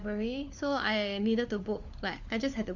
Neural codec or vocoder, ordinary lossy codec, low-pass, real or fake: codec, 16 kHz, 4 kbps, FunCodec, trained on LibriTTS, 50 frames a second; none; 7.2 kHz; fake